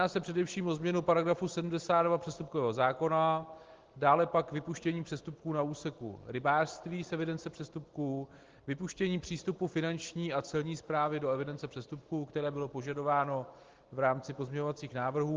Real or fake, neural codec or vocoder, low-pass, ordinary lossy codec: real; none; 7.2 kHz; Opus, 16 kbps